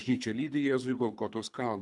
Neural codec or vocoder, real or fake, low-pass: codec, 24 kHz, 3 kbps, HILCodec; fake; 10.8 kHz